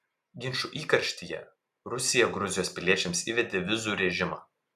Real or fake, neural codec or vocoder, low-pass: real; none; 14.4 kHz